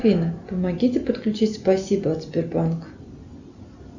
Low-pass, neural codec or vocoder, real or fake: 7.2 kHz; none; real